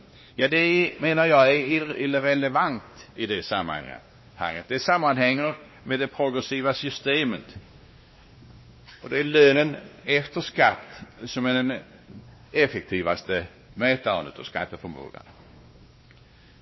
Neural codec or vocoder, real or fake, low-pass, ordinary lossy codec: codec, 16 kHz, 2 kbps, X-Codec, WavLM features, trained on Multilingual LibriSpeech; fake; 7.2 kHz; MP3, 24 kbps